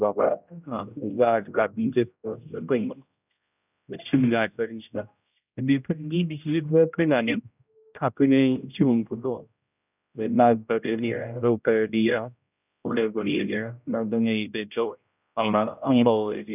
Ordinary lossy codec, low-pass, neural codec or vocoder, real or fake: none; 3.6 kHz; codec, 16 kHz, 0.5 kbps, X-Codec, HuBERT features, trained on general audio; fake